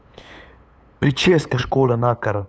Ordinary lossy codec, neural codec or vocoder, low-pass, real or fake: none; codec, 16 kHz, 8 kbps, FunCodec, trained on LibriTTS, 25 frames a second; none; fake